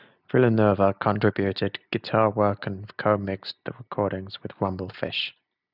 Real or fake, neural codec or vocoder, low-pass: real; none; 5.4 kHz